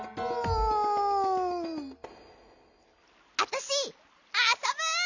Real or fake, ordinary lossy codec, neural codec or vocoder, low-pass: real; none; none; 7.2 kHz